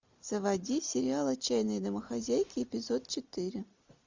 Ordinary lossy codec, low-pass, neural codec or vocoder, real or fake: MP3, 64 kbps; 7.2 kHz; none; real